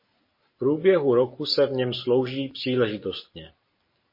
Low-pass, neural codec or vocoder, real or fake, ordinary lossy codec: 5.4 kHz; none; real; MP3, 24 kbps